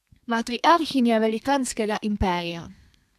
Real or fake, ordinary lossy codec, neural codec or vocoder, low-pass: fake; none; codec, 32 kHz, 1.9 kbps, SNAC; 14.4 kHz